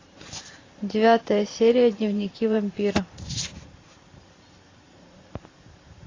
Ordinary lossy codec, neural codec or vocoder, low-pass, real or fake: AAC, 32 kbps; vocoder, 44.1 kHz, 128 mel bands every 512 samples, BigVGAN v2; 7.2 kHz; fake